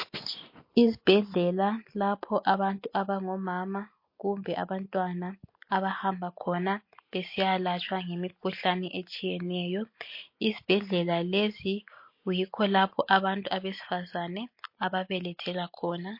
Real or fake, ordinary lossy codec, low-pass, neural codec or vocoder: real; MP3, 32 kbps; 5.4 kHz; none